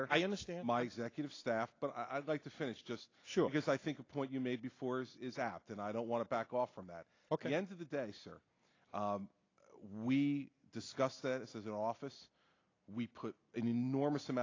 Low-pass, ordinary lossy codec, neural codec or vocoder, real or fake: 7.2 kHz; AAC, 32 kbps; none; real